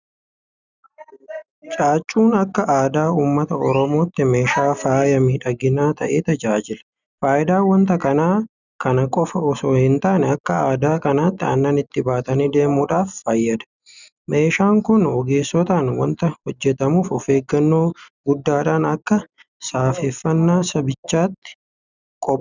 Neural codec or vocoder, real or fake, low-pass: none; real; 7.2 kHz